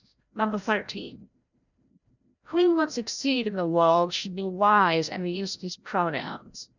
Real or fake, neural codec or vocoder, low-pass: fake; codec, 16 kHz, 0.5 kbps, FreqCodec, larger model; 7.2 kHz